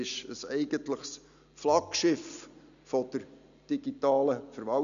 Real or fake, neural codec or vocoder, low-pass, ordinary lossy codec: real; none; 7.2 kHz; none